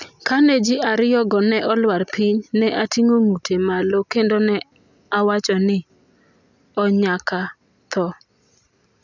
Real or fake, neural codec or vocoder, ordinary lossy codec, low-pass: real; none; none; 7.2 kHz